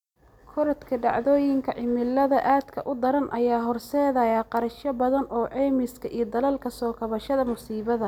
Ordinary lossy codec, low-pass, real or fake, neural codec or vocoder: none; 19.8 kHz; real; none